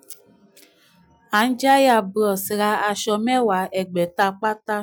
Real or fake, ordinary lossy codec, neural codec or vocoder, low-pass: real; none; none; none